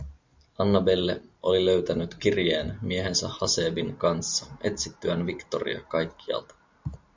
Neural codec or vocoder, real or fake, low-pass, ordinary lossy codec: none; real; 7.2 kHz; MP3, 64 kbps